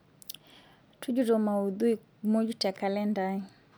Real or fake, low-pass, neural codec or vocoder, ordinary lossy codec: real; none; none; none